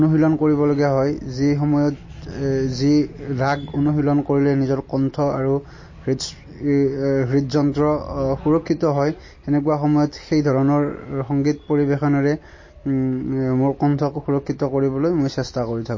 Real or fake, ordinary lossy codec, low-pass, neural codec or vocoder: real; MP3, 32 kbps; 7.2 kHz; none